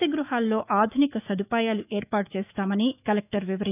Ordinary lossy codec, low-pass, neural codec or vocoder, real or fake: none; 3.6 kHz; autoencoder, 48 kHz, 128 numbers a frame, DAC-VAE, trained on Japanese speech; fake